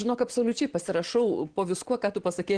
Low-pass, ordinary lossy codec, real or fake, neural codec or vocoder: 9.9 kHz; Opus, 16 kbps; real; none